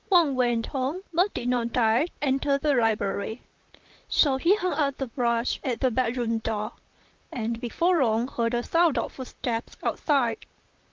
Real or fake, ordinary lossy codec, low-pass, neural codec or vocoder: fake; Opus, 24 kbps; 7.2 kHz; vocoder, 44.1 kHz, 128 mel bands, Pupu-Vocoder